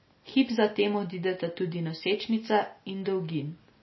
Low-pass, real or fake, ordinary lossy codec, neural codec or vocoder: 7.2 kHz; real; MP3, 24 kbps; none